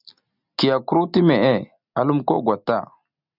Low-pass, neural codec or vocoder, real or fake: 5.4 kHz; none; real